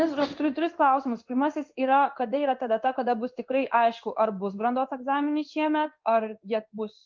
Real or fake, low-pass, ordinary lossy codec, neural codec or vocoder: fake; 7.2 kHz; Opus, 24 kbps; codec, 16 kHz in and 24 kHz out, 1 kbps, XY-Tokenizer